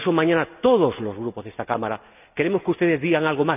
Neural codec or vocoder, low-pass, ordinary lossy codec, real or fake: none; 3.6 kHz; none; real